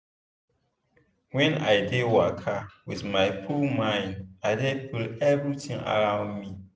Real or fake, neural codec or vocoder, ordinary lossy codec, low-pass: real; none; none; none